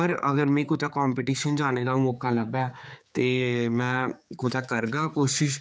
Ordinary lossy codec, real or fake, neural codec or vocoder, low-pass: none; fake; codec, 16 kHz, 4 kbps, X-Codec, HuBERT features, trained on general audio; none